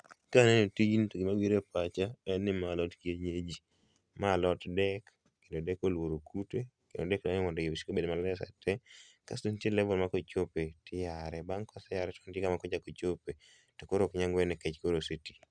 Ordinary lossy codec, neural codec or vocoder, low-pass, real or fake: Opus, 64 kbps; none; 9.9 kHz; real